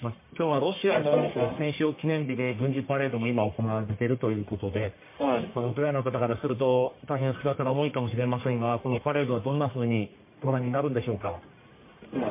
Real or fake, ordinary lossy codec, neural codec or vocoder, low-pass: fake; MP3, 24 kbps; codec, 44.1 kHz, 1.7 kbps, Pupu-Codec; 3.6 kHz